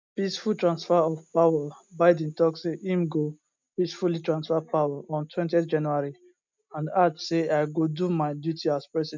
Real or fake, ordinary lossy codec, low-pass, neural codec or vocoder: real; MP3, 64 kbps; 7.2 kHz; none